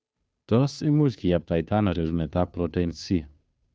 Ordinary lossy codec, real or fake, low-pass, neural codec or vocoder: none; fake; none; codec, 16 kHz, 2 kbps, FunCodec, trained on Chinese and English, 25 frames a second